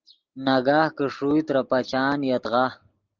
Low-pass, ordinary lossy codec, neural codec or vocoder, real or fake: 7.2 kHz; Opus, 32 kbps; none; real